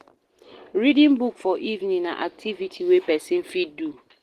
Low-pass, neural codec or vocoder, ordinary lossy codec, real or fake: 14.4 kHz; none; Opus, 16 kbps; real